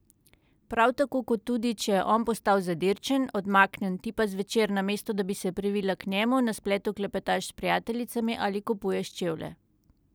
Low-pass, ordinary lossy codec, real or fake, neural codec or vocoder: none; none; real; none